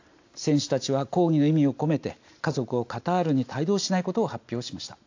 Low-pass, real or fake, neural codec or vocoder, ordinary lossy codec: 7.2 kHz; real; none; AAC, 48 kbps